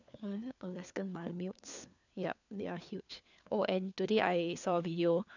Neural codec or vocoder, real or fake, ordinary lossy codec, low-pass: codec, 16 kHz, 4 kbps, FunCodec, trained on LibriTTS, 50 frames a second; fake; none; 7.2 kHz